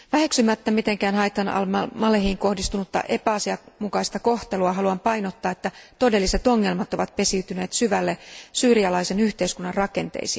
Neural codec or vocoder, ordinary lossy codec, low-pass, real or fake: none; none; none; real